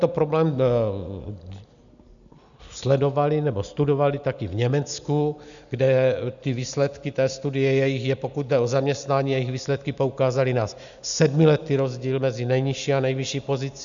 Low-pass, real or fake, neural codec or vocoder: 7.2 kHz; real; none